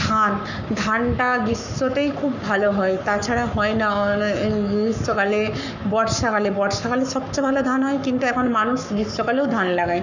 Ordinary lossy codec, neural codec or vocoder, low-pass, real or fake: none; codec, 44.1 kHz, 7.8 kbps, Pupu-Codec; 7.2 kHz; fake